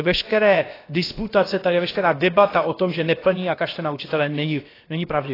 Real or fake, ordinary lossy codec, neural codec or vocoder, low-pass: fake; AAC, 24 kbps; codec, 16 kHz, about 1 kbps, DyCAST, with the encoder's durations; 5.4 kHz